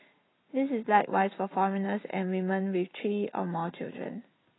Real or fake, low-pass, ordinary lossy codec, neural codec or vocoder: real; 7.2 kHz; AAC, 16 kbps; none